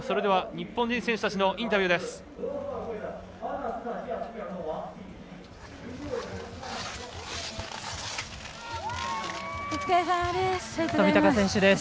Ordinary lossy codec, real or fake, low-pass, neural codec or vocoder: none; real; none; none